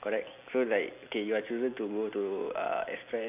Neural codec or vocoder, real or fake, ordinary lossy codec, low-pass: none; real; AAC, 32 kbps; 3.6 kHz